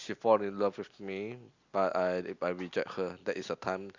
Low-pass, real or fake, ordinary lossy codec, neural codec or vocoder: 7.2 kHz; real; Opus, 64 kbps; none